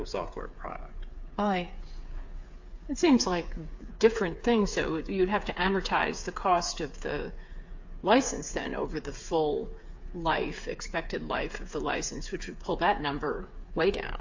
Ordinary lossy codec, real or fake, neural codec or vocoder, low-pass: AAC, 48 kbps; fake; codec, 16 kHz, 8 kbps, FreqCodec, smaller model; 7.2 kHz